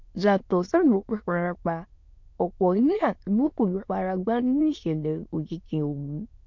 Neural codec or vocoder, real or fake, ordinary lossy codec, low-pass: autoencoder, 22.05 kHz, a latent of 192 numbers a frame, VITS, trained on many speakers; fake; MP3, 48 kbps; 7.2 kHz